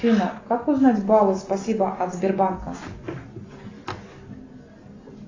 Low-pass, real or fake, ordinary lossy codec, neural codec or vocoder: 7.2 kHz; real; AAC, 32 kbps; none